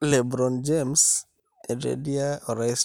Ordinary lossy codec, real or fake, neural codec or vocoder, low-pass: none; real; none; none